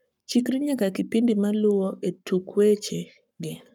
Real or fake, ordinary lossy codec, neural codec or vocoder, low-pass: fake; none; codec, 44.1 kHz, 7.8 kbps, Pupu-Codec; 19.8 kHz